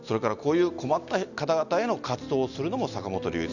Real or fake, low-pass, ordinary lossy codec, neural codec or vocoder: real; 7.2 kHz; none; none